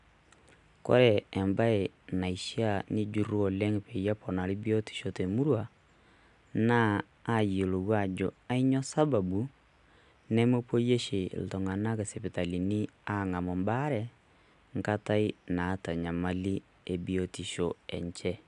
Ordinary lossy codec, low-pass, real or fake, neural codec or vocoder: none; 10.8 kHz; real; none